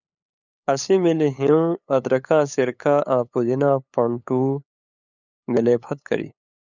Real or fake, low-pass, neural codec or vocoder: fake; 7.2 kHz; codec, 16 kHz, 8 kbps, FunCodec, trained on LibriTTS, 25 frames a second